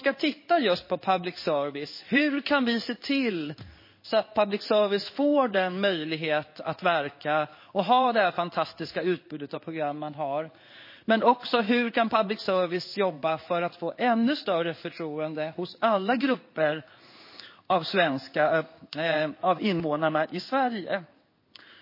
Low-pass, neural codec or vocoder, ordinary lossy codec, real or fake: 5.4 kHz; codec, 16 kHz in and 24 kHz out, 1 kbps, XY-Tokenizer; MP3, 24 kbps; fake